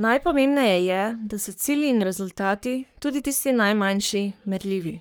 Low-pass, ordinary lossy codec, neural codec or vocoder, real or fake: none; none; codec, 44.1 kHz, 3.4 kbps, Pupu-Codec; fake